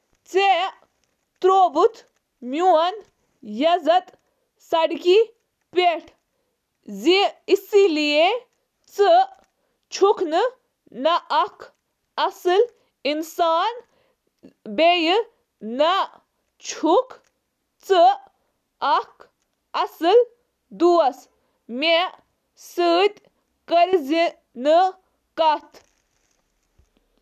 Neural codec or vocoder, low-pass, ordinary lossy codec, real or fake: none; 14.4 kHz; none; real